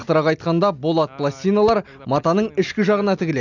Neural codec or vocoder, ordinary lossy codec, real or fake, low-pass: none; none; real; 7.2 kHz